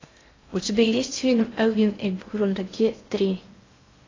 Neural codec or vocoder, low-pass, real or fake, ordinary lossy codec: codec, 16 kHz in and 24 kHz out, 0.6 kbps, FocalCodec, streaming, 4096 codes; 7.2 kHz; fake; AAC, 32 kbps